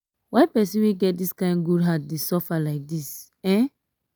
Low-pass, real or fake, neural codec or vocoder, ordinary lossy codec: none; real; none; none